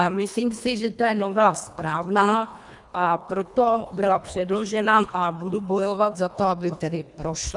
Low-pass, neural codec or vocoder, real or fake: 10.8 kHz; codec, 24 kHz, 1.5 kbps, HILCodec; fake